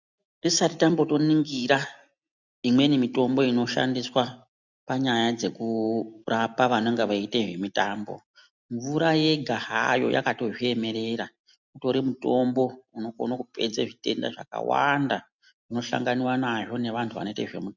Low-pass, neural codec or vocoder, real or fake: 7.2 kHz; none; real